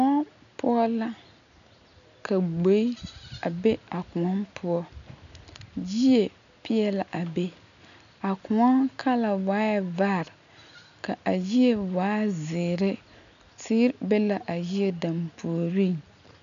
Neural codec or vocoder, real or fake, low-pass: none; real; 7.2 kHz